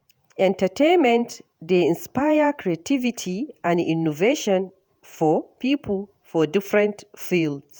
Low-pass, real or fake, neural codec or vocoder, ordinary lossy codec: none; fake; vocoder, 48 kHz, 128 mel bands, Vocos; none